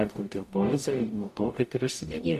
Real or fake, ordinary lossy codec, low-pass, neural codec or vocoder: fake; MP3, 64 kbps; 14.4 kHz; codec, 44.1 kHz, 0.9 kbps, DAC